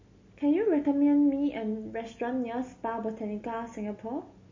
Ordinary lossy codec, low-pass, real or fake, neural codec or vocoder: MP3, 32 kbps; 7.2 kHz; real; none